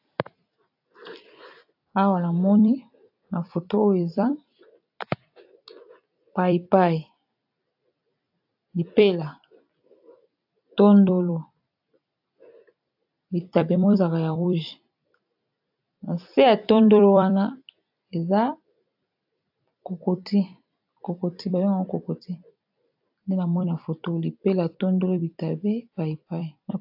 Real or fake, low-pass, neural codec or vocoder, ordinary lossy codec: fake; 5.4 kHz; vocoder, 44.1 kHz, 128 mel bands every 256 samples, BigVGAN v2; AAC, 48 kbps